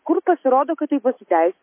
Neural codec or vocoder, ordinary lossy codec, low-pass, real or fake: none; MP3, 24 kbps; 3.6 kHz; real